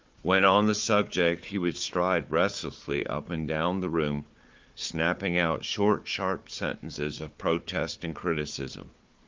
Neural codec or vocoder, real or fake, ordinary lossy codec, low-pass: codec, 16 kHz, 4 kbps, FunCodec, trained on Chinese and English, 50 frames a second; fake; Opus, 32 kbps; 7.2 kHz